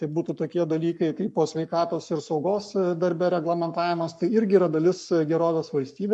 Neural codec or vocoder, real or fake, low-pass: codec, 44.1 kHz, 7.8 kbps, Pupu-Codec; fake; 10.8 kHz